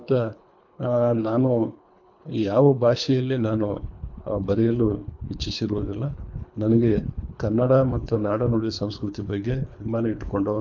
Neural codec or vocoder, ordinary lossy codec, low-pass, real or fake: codec, 24 kHz, 3 kbps, HILCodec; MP3, 64 kbps; 7.2 kHz; fake